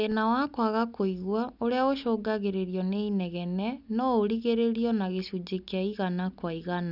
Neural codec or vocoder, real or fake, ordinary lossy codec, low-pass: none; real; none; 5.4 kHz